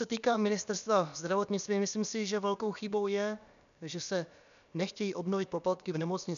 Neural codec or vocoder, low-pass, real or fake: codec, 16 kHz, about 1 kbps, DyCAST, with the encoder's durations; 7.2 kHz; fake